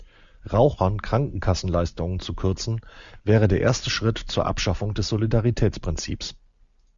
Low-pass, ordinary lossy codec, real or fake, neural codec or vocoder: 7.2 kHz; Opus, 64 kbps; real; none